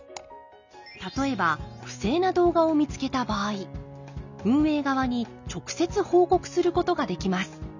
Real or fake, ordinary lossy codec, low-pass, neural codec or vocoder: real; none; 7.2 kHz; none